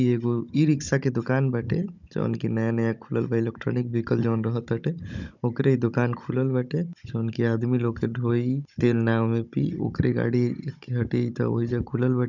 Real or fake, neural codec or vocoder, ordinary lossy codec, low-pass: fake; codec, 16 kHz, 16 kbps, FunCodec, trained on Chinese and English, 50 frames a second; none; 7.2 kHz